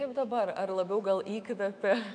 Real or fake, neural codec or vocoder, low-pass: fake; autoencoder, 48 kHz, 128 numbers a frame, DAC-VAE, trained on Japanese speech; 9.9 kHz